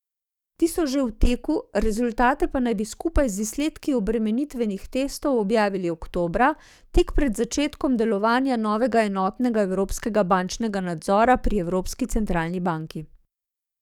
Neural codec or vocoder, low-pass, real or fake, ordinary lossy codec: codec, 44.1 kHz, 7.8 kbps, DAC; 19.8 kHz; fake; none